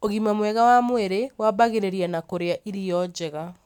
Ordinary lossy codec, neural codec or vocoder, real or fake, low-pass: none; none; real; 19.8 kHz